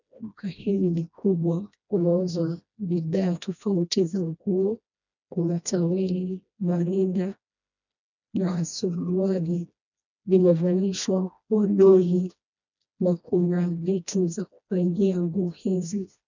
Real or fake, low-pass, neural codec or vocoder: fake; 7.2 kHz; codec, 16 kHz, 1 kbps, FreqCodec, smaller model